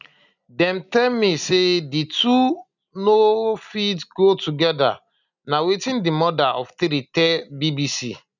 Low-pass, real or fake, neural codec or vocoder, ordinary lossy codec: 7.2 kHz; real; none; none